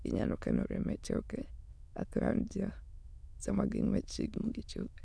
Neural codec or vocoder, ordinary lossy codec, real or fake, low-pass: autoencoder, 22.05 kHz, a latent of 192 numbers a frame, VITS, trained on many speakers; none; fake; none